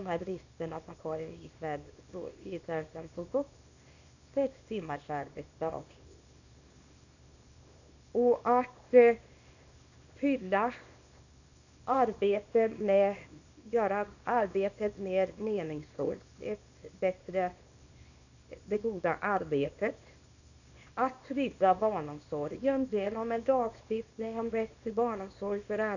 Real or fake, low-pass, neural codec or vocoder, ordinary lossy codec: fake; 7.2 kHz; codec, 24 kHz, 0.9 kbps, WavTokenizer, small release; none